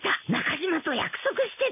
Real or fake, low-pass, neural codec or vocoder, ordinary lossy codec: real; 3.6 kHz; none; none